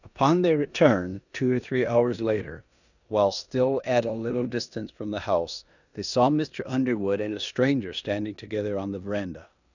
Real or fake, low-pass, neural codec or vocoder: fake; 7.2 kHz; codec, 16 kHz in and 24 kHz out, 0.9 kbps, LongCat-Audio-Codec, four codebook decoder